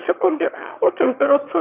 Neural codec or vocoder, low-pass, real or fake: autoencoder, 22.05 kHz, a latent of 192 numbers a frame, VITS, trained on one speaker; 3.6 kHz; fake